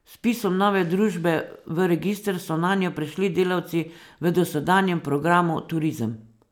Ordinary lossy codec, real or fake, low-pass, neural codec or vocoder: none; real; 19.8 kHz; none